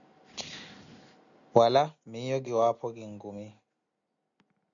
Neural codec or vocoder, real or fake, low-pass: none; real; 7.2 kHz